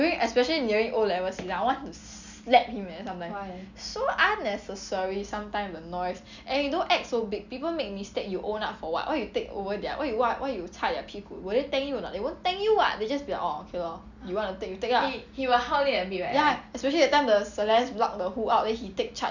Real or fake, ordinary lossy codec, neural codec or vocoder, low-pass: real; none; none; 7.2 kHz